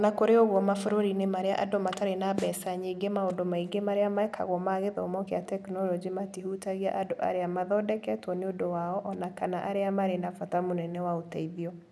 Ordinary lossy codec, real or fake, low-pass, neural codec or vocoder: none; real; none; none